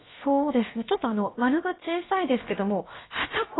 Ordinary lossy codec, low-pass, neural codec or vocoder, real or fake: AAC, 16 kbps; 7.2 kHz; codec, 16 kHz, about 1 kbps, DyCAST, with the encoder's durations; fake